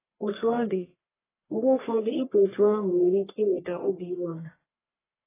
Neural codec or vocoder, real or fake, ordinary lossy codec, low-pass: codec, 44.1 kHz, 1.7 kbps, Pupu-Codec; fake; AAC, 16 kbps; 3.6 kHz